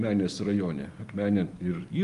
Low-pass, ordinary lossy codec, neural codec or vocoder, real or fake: 10.8 kHz; Opus, 32 kbps; none; real